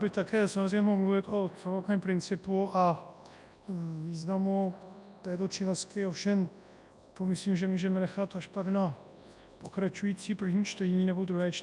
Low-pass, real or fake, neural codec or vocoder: 10.8 kHz; fake; codec, 24 kHz, 0.9 kbps, WavTokenizer, large speech release